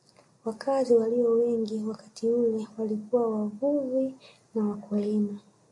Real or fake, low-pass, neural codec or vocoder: real; 10.8 kHz; none